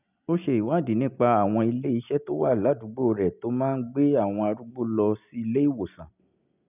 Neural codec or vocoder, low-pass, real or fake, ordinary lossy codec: none; 3.6 kHz; real; none